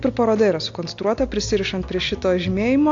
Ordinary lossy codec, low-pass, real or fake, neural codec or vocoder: AAC, 48 kbps; 7.2 kHz; real; none